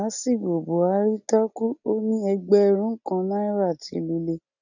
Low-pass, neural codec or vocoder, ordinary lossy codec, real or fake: 7.2 kHz; none; none; real